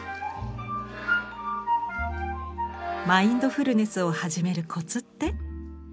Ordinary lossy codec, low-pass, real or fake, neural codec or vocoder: none; none; real; none